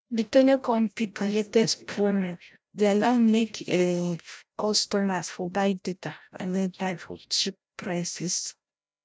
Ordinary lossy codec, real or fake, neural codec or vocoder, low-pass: none; fake; codec, 16 kHz, 0.5 kbps, FreqCodec, larger model; none